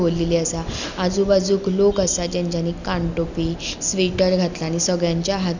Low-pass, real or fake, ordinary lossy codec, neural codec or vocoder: 7.2 kHz; real; none; none